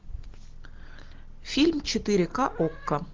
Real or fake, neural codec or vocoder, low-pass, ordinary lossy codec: real; none; 7.2 kHz; Opus, 16 kbps